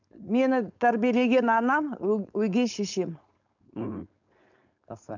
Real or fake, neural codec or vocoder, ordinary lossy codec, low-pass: fake; codec, 16 kHz, 4.8 kbps, FACodec; none; 7.2 kHz